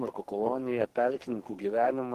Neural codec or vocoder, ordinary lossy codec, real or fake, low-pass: codec, 44.1 kHz, 2.6 kbps, SNAC; Opus, 16 kbps; fake; 14.4 kHz